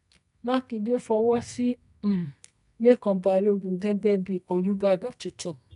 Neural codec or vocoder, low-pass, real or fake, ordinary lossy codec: codec, 24 kHz, 0.9 kbps, WavTokenizer, medium music audio release; 10.8 kHz; fake; none